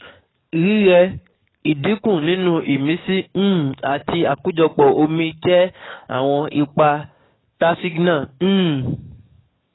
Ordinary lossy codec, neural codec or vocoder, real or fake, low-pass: AAC, 16 kbps; codec, 44.1 kHz, 7.8 kbps, DAC; fake; 7.2 kHz